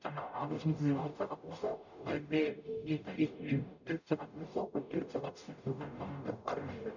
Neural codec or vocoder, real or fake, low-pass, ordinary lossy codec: codec, 44.1 kHz, 0.9 kbps, DAC; fake; 7.2 kHz; none